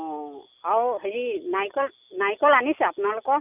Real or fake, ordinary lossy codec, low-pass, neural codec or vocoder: real; none; 3.6 kHz; none